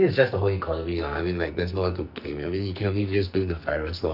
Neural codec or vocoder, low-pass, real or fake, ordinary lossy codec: codec, 44.1 kHz, 2.6 kbps, SNAC; 5.4 kHz; fake; none